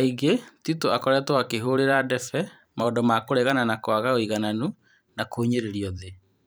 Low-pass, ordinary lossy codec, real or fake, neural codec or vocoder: none; none; fake; vocoder, 44.1 kHz, 128 mel bands every 512 samples, BigVGAN v2